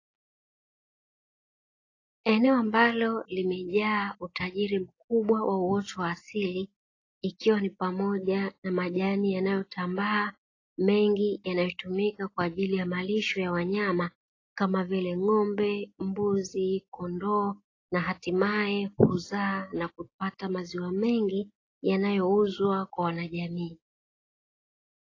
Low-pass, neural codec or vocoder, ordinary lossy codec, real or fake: 7.2 kHz; none; AAC, 32 kbps; real